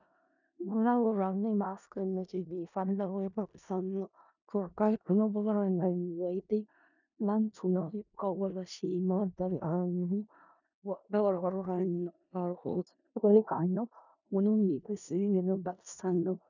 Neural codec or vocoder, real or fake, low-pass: codec, 16 kHz in and 24 kHz out, 0.4 kbps, LongCat-Audio-Codec, four codebook decoder; fake; 7.2 kHz